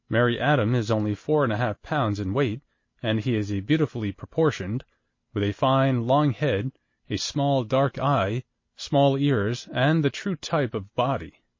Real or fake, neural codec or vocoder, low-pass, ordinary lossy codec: real; none; 7.2 kHz; MP3, 32 kbps